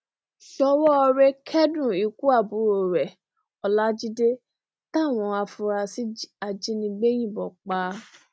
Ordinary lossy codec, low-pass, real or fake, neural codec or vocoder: none; none; real; none